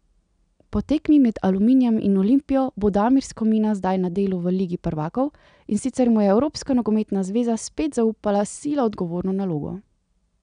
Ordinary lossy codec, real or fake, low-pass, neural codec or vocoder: none; real; 9.9 kHz; none